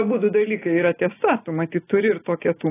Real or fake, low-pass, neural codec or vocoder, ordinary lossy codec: real; 3.6 kHz; none; AAC, 16 kbps